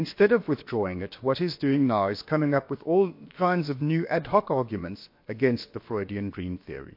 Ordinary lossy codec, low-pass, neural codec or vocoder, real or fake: MP3, 32 kbps; 5.4 kHz; codec, 16 kHz, about 1 kbps, DyCAST, with the encoder's durations; fake